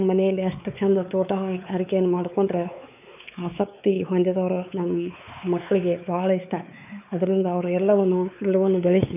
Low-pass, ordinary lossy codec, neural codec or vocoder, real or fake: 3.6 kHz; none; codec, 16 kHz, 4 kbps, X-Codec, WavLM features, trained on Multilingual LibriSpeech; fake